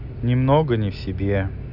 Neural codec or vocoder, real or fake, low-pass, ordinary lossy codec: none; real; 5.4 kHz; none